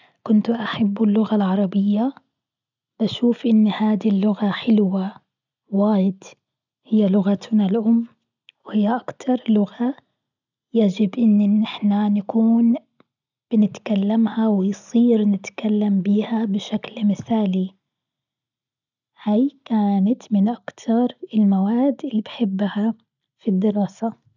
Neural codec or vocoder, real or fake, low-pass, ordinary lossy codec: none; real; 7.2 kHz; none